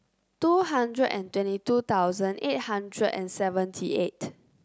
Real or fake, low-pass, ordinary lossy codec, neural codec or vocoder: real; none; none; none